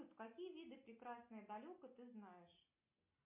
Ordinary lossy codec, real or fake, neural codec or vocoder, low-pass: MP3, 32 kbps; real; none; 3.6 kHz